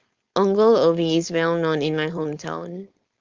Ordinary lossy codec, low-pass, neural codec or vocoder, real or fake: Opus, 32 kbps; 7.2 kHz; codec, 16 kHz, 4.8 kbps, FACodec; fake